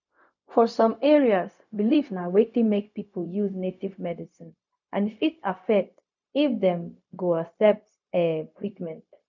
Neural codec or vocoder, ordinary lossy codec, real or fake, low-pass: codec, 16 kHz, 0.4 kbps, LongCat-Audio-Codec; none; fake; 7.2 kHz